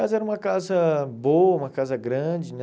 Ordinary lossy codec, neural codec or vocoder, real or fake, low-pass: none; none; real; none